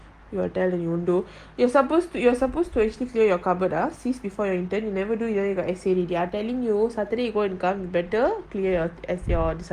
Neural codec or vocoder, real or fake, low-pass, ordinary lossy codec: none; real; 14.4 kHz; Opus, 24 kbps